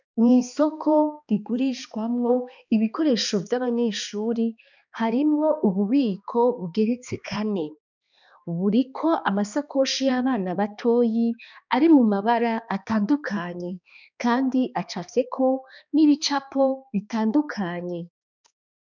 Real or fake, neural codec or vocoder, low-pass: fake; codec, 16 kHz, 2 kbps, X-Codec, HuBERT features, trained on balanced general audio; 7.2 kHz